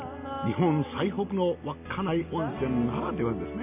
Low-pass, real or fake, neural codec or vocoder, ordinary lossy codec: 3.6 kHz; real; none; none